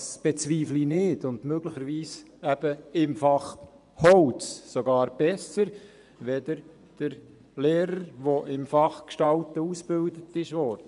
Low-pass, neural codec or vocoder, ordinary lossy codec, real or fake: 10.8 kHz; vocoder, 24 kHz, 100 mel bands, Vocos; none; fake